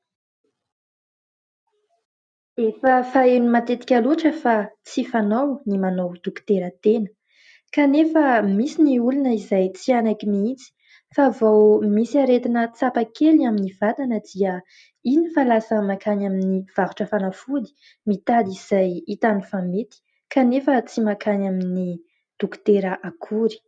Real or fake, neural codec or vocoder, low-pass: real; none; 9.9 kHz